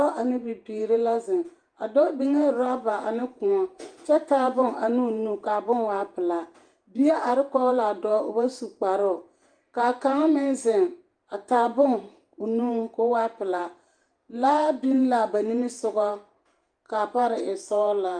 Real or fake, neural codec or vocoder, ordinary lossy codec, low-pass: fake; vocoder, 48 kHz, 128 mel bands, Vocos; Opus, 32 kbps; 9.9 kHz